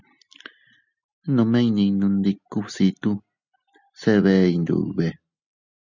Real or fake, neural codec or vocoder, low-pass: real; none; 7.2 kHz